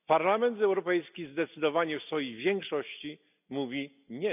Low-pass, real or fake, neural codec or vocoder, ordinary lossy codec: 3.6 kHz; real; none; none